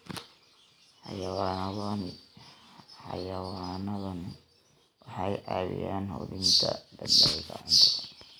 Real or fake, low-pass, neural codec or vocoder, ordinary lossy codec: real; none; none; none